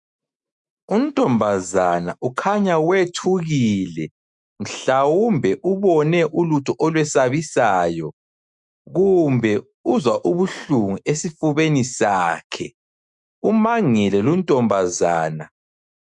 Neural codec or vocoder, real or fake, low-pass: autoencoder, 48 kHz, 128 numbers a frame, DAC-VAE, trained on Japanese speech; fake; 10.8 kHz